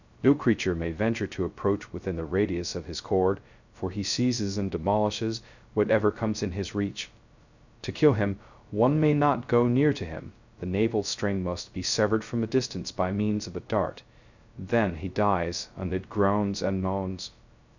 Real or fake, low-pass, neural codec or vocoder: fake; 7.2 kHz; codec, 16 kHz, 0.2 kbps, FocalCodec